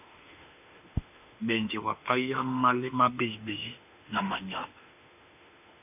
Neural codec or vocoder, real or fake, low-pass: autoencoder, 48 kHz, 32 numbers a frame, DAC-VAE, trained on Japanese speech; fake; 3.6 kHz